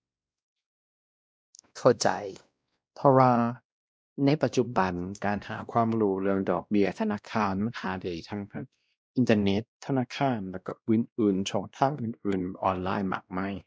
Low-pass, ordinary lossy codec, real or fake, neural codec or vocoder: none; none; fake; codec, 16 kHz, 1 kbps, X-Codec, WavLM features, trained on Multilingual LibriSpeech